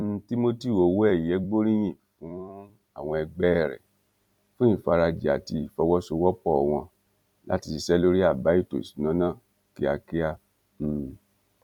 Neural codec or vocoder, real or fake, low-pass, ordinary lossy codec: vocoder, 44.1 kHz, 128 mel bands every 256 samples, BigVGAN v2; fake; 19.8 kHz; none